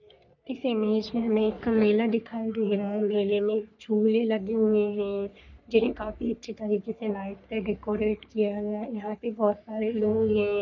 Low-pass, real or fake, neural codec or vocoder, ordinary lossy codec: 7.2 kHz; fake; codec, 44.1 kHz, 3.4 kbps, Pupu-Codec; none